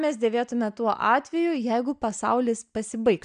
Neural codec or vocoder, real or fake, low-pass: none; real; 9.9 kHz